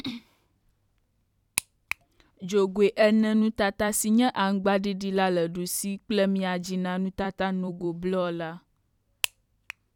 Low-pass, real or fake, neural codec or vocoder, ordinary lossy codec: 19.8 kHz; fake; vocoder, 44.1 kHz, 128 mel bands every 256 samples, BigVGAN v2; none